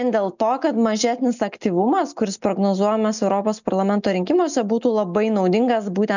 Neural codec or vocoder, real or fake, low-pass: none; real; 7.2 kHz